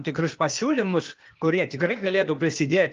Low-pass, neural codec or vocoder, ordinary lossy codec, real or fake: 7.2 kHz; codec, 16 kHz, 0.8 kbps, ZipCodec; Opus, 32 kbps; fake